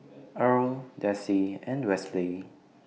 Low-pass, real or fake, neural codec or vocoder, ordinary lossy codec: none; real; none; none